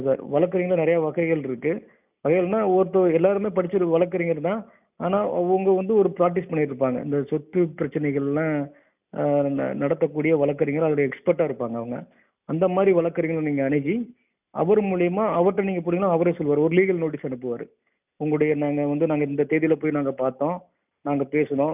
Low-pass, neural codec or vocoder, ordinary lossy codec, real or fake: 3.6 kHz; none; none; real